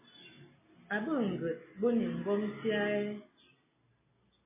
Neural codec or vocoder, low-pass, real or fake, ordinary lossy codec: none; 3.6 kHz; real; MP3, 16 kbps